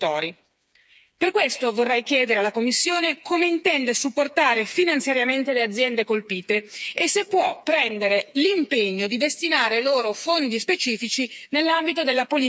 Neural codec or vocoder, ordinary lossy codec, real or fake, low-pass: codec, 16 kHz, 4 kbps, FreqCodec, smaller model; none; fake; none